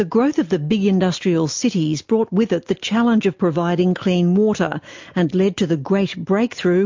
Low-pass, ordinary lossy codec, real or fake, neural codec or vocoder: 7.2 kHz; MP3, 48 kbps; real; none